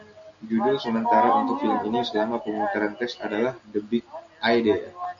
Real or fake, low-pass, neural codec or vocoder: real; 7.2 kHz; none